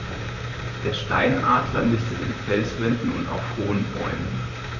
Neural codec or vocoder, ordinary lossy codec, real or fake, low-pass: vocoder, 44.1 kHz, 128 mel bands, Pupu-Vocoder; none; fake; 7.2 kHz